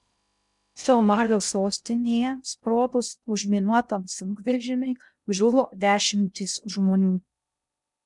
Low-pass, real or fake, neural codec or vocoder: 10.8 kHz; fake; codec, 16 kHz in and 24 kHz out, 0.6 kbps, FocalCodec, streaming, 2048 codes